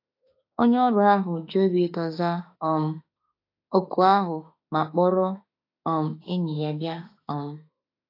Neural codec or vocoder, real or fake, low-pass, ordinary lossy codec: autoencoder, 48 kHz, 32 numbers a frame, DAC-VAE, trained on Japanese speech; fake; 5.4 kHz; AAC, 32 kbps